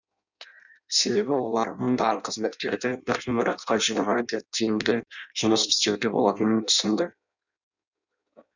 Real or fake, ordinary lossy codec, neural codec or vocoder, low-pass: fake; none; codec, 16 kHz in and 24 kHz out, 0.6 kbps, FireRedTTS-2 codec; 7.2 kHz